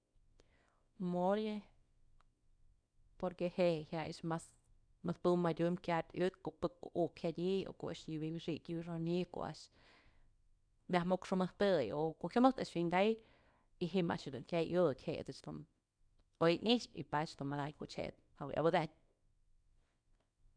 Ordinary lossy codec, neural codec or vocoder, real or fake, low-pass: none; codec, 24 kHz, 0.9 kbps, WavTokenizer, small release; fake; 9.9 kHz